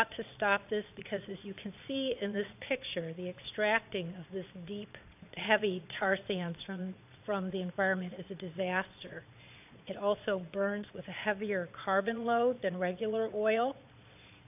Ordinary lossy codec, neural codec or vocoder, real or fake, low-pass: AAC, 32 kbps; vocoder, 22.05 kHz, 80 mel bands, Vocos; fake; 3.6 kHz